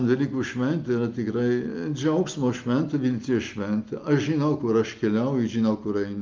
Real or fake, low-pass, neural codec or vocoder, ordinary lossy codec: real; 7.2 kHz; none; Opus, 24 kbps